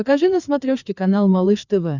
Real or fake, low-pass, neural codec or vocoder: fake; 7.2 kHz; codec, 16 kHz, 4 kbps, FreqCodec, larger model